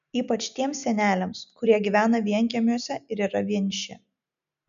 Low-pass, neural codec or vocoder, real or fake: 7.2 kHz; none; real